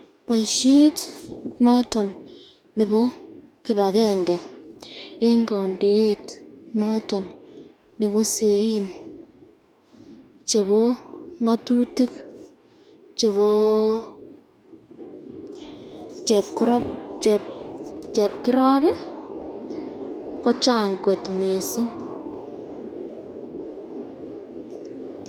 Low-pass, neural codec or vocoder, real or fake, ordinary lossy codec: 19.8 kHz; codec, 44.1 kHz, 2.6 kbps, DAC; fake; none